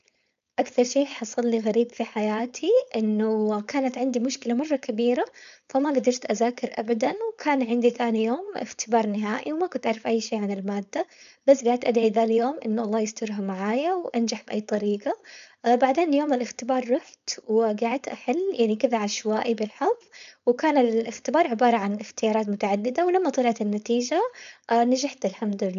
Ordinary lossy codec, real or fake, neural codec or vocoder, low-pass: none; fake; codec, 16 kHz, 4.8 kbps, FACodec; 7.2 kHz